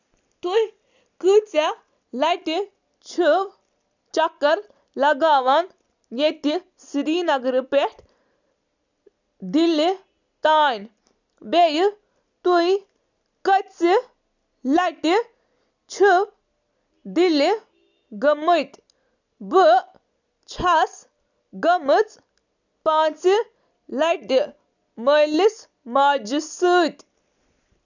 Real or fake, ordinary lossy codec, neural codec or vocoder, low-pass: real; none; none; 7.2 kHz